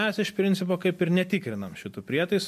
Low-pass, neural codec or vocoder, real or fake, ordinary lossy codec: 14.4 kHz; none; real; MP3, 64 kbps